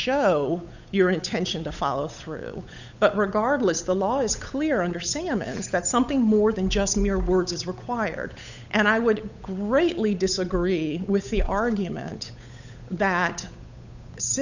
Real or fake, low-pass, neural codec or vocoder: fake; 7.2 kHz; codec, 16 kHz, 8 kbps, FunCodec, trained on Chinese and English, 25 frames a second